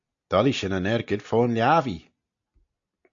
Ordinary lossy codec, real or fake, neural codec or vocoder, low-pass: AAC, 64 kbps; real; none; 7.2 kHz